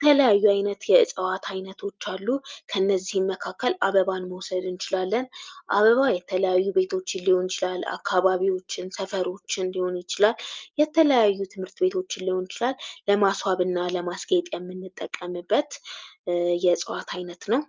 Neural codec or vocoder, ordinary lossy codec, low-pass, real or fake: none; Opus, 24 kbps; 7.2 kHz; real